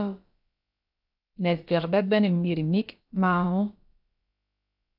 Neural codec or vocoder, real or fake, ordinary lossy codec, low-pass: codec, 16 kHz, about 1 kbps, DyCAST, with the encoder's durations; fake; AAC, 48 kbps; 5.4 kHz